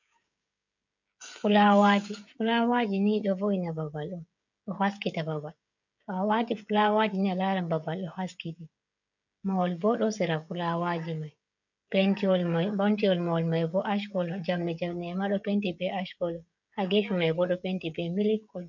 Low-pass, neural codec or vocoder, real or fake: 7.2 kHz; codec, 16 kHz, 16 kbps, FreqCodec, smaller model; fake